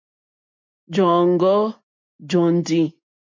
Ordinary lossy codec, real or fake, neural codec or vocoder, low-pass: MP3, 48 kbps; real; none; 7.2 kHz